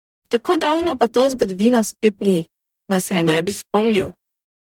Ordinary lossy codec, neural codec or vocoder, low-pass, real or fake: MP3, 96 kbps; codec, 44.1 kHz, 0.9 kbps, DAC; 19.8 kHz; fake